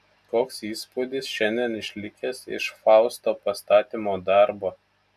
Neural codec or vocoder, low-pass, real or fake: none; 14.4 kHz; real